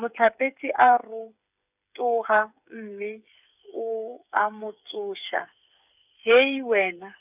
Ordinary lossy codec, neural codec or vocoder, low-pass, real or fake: none; codec, 16 kHz, 8 kbps, FreqCodec, smaller model; 3.6 kHz; fake